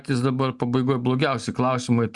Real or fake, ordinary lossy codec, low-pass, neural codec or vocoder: real; Opus, 64 kbps; 10.8 kHz; none